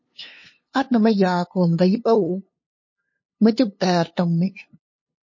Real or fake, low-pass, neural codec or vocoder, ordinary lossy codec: fake; 7.2 kHz; codec, 16 kHz, 2 kbps, FunCodec, trained on LibriTTS, 25 frames a second; MP3, 32 kbps